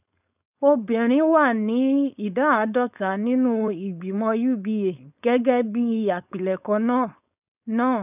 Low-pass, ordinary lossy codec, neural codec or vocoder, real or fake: 3.6 kHz; none; codec, 16 kHz, 4.8 kbps, FACodec; fake